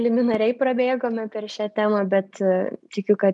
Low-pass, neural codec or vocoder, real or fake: 10.8 kHz; none; real